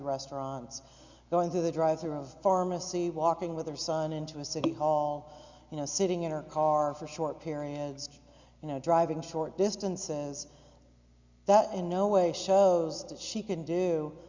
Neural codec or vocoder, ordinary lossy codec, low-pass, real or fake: none; Opus, 64 kbps; 7.2 kHz; real